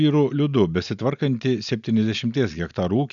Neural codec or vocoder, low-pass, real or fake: none; 7.2 kHz; real